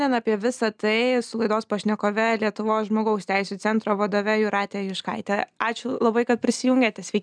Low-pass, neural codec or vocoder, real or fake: 9.9 kHz; none; real